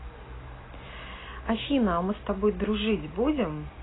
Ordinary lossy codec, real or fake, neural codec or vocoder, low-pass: AAC, 16 kbps; real; none; 7.2 kHz